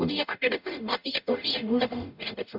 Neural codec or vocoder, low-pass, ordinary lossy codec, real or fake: codec, 44.1 kHz, 0.9 kbps, DAC; 5.4 kHz; none; fake